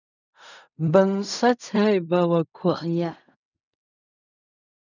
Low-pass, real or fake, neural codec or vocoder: 7.2 kHz; fake; codec, 16 kHz in and 24 kHz out, 0.4 kbps, LongCat-Audio-Codec, fine tuned four codebook decoder